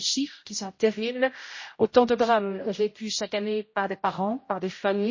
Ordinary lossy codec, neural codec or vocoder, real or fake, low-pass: MP3, 32 kbps; codec, 16 kHz, 0.5 kbps, X-Codec, HuBERT features, trained on general audio; fake; 7.2 kHz